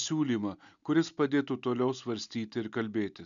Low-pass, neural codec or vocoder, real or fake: 7.2 kHz; none; real